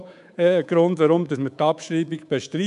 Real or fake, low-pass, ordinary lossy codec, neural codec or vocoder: fake; 10.8 kHz; none; codec, 24 kHz, 3.1 kbps, DualCodec